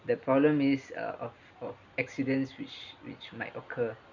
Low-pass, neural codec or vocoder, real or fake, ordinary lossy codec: 7.2 kHz; none; real; none